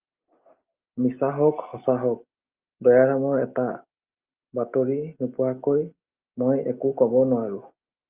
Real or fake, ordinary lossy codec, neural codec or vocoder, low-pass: real; Opus, 24 kbps; none; 3.6 kHz